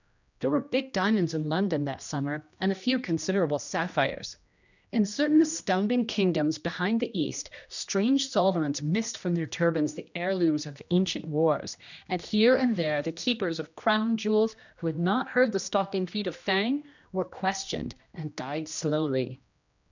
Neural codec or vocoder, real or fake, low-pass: codec, 16 kHz, 1 kbps, X-Codec, HuBERT features, trained on general audio; fake; 7.2 kHz